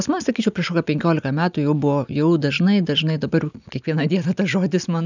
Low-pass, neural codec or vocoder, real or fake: 7.2 kHz; none; real